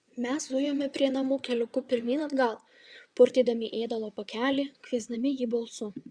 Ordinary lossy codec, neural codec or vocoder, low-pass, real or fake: Opus, 64 kbps; vocoder, 22.05 kHz, 80 mel bands, WaveNeXt; 9.9 kHz; fake